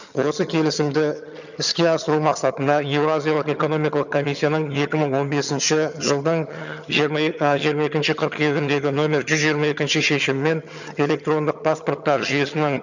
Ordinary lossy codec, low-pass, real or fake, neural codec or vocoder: none; 7.2 kHz; fake; vocoder, 22.05 kHz, 80 mel bands, HiFi-GAN